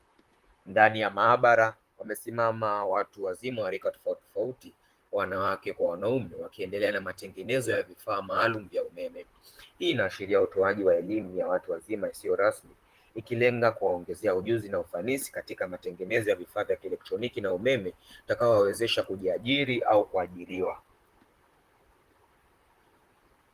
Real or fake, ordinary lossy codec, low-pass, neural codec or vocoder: fake; Opus, 24 kbps; 14.4 kHz; vocoder, 44.1 kHz, 128 mel bands, Pupu-Vocoder